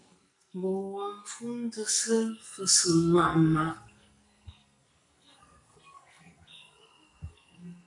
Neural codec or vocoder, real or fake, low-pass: codec, 44.1 kHz, 2.6 kbps, SNAC; fake; 10.8 kHz